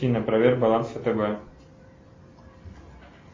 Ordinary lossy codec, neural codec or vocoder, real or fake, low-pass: MP3, 32 kbps; none; real; 7.2 kHz